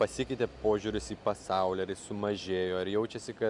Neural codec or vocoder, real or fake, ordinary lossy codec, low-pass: none; real; MP3, 96 kbps; 10.8 kHz